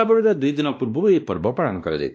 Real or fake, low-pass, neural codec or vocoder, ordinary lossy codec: fake; none; codec, 16 kHz, 1 kbps, X-Codec, WavLM features, trained on Multilingual LibriSpeech; none